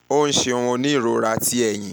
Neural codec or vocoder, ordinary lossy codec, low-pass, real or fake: none; none; none; real